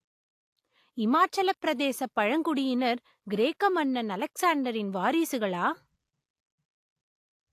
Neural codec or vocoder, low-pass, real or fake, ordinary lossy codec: none; 14.4 kHz; real; AAC, 64 kbps